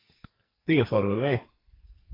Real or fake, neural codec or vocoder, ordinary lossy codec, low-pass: fake; codec, 44.1 kHz, 2.6 kbps, SNAC; AAC, 24 kbps; 5.4 kHz